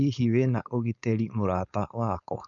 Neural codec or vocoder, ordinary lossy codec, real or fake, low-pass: codec, 16 kHz, 4.8 kbps, FACodec; none; fake; 7.2 kHz